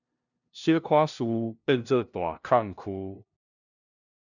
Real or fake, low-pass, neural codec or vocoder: fake; 7.2 kHz; codec, 16 kHz, 0.5 kbps, FunCodec, trained on LibriTTS, 25 frames a second